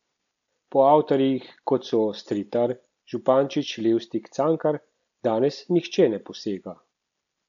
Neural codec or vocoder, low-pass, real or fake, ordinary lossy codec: none; 7.2 kHz; real; none